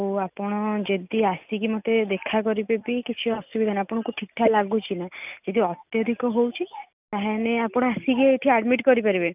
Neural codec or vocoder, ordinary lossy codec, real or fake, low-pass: none; none; real; 3.6 kHz